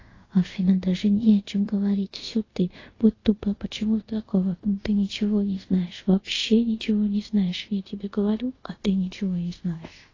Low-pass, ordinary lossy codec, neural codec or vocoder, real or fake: 7.2 kHz; AAC, 32 kbps; codec, 24 kHz, 0.5 kbps, DualCodec; fake